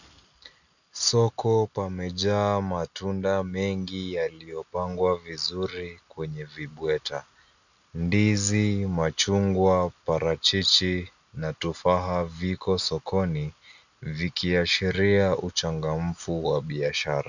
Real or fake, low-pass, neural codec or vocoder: real; 7.2 kHz; none